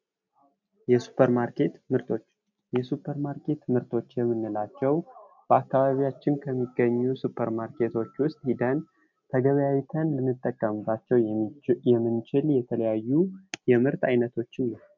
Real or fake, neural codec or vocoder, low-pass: real; none; 7.2 kHz